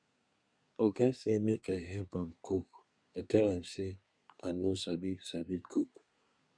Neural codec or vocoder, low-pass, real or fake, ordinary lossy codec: codec, 24 kHz, 1 kbps, SNAC; 9.9 kHz; fake; none